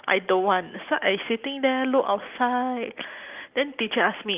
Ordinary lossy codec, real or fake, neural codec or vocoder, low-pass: Opus, 24 kbps; real; none; 3.6 kHz